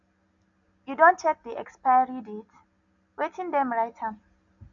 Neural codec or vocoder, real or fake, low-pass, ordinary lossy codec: none; real; 7.2 kHz; none